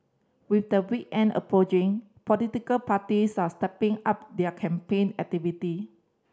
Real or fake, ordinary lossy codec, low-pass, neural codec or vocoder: real; none; none; none